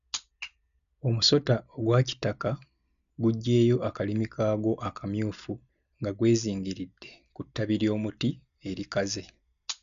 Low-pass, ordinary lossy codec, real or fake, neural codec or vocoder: 7.2 kHz; MP3, 96 kbps; real; none